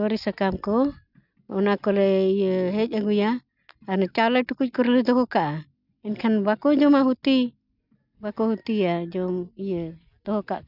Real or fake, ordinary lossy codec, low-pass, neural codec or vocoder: real; none; 5.4 kHz; none